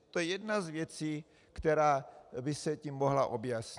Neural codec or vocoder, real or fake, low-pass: none; real; 10.8 kHz